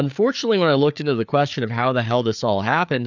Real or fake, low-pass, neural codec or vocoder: fake; 7.2 kHz; codec, 16 kHz, 4 kbps, FreqCodec, larger model